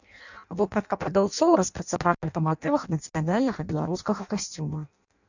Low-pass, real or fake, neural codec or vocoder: 7.2 kHz; fake; codec, 16 kHz in and 24 kHz out, 0.6 kbps, FireRedTTS-2 codec